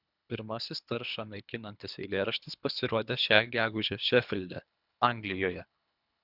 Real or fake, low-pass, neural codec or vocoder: fake; 5.4 kHz; codec, 24 kHz, 3 kbps, HILCodec